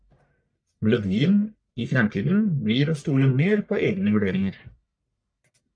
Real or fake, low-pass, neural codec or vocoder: fake; 9.9 kHz; codec, 44.1 kHz, 1.7 kbps, Pupu-Codec